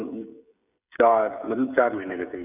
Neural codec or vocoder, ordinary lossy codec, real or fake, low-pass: codec, 16 kHz, 16 kbps, FreqCodec, smaller model; none; fake; 3.6 kHz